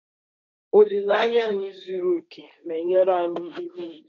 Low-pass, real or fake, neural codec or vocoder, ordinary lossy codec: 7.2 kHz; fake; codec, 16 kHz, 1.1 kbps, Voila-Tokenizer; none